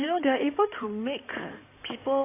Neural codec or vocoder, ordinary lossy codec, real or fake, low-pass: codec, 16 kHz in and 24 kHz out, 2.2 kbps, FireRedTTS-2 codec; AAC, 24 kbps; fake; 3.6 kHz